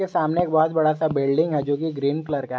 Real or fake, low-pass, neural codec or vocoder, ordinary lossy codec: real; none; none; none